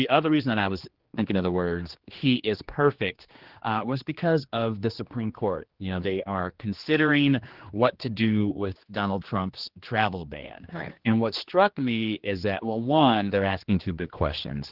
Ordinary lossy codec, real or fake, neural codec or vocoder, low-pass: Opus, 16 kbps; fake; codec, 16 kHz, 2 kbps, X-Codec, HuBERT features, trained on general audio; 5.4 kHz